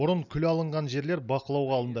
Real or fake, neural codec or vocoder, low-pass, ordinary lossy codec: real; none; 7.2 kHz; none